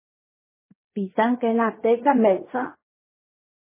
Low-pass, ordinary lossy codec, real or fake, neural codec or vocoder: 3.6 kHz; MP3, 16 kbps; fake; codec, 16 kHz in and 24 kHz out, 0.4 kbps, LongCat-Audio-Codec, fine tuned four codebook decoder